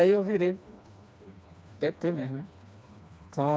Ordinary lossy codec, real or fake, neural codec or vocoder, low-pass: none; fake; codec, 16 kHz, 2 kbps, FreqCodec, smaller model; none